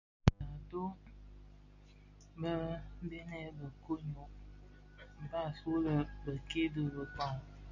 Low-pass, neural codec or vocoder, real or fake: 7.2 kHz; none; real